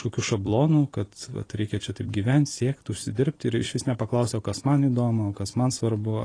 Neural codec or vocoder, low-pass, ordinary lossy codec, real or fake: vocoder, 44.1 kHz, 128 mel bands every 256 samples, BigVGAN v2; 9.9 kHz; AAC, 32 kbps; fake